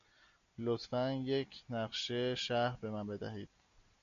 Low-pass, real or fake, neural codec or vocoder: 7.2 kHz; real; none